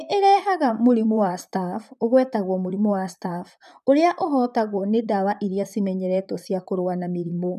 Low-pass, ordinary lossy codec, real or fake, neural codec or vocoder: 14.4 kHz; none; fake; vocoder, 44.1 kHz, 128 mel bands, Pupu-Vocoder